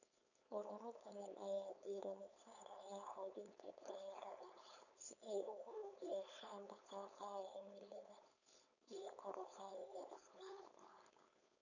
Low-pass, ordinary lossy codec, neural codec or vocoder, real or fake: 7.2 kHz; none; codec, 16 kHz, 4.8 kbps, FACodec; fake